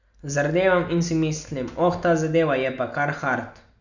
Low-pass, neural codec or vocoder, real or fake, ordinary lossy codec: 7.2 kHz; none; real; none